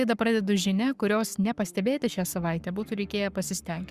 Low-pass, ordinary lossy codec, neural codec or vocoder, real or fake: 14.4 kHz; Opus, 64 kbps; codec, 44.1 kHz, 7.8 kbps, Pupu-Codec; fake